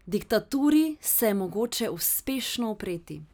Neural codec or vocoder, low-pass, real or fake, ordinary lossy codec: none; none; real; none